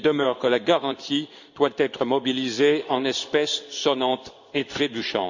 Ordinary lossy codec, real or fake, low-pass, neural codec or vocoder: none; fake; 7.2 kHz; codec, 16 kHz in and 24 kHz out, 1 kbps, XY-Tokenizer